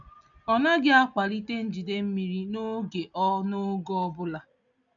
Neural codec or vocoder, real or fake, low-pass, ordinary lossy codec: none; real; 7.2 kHz; none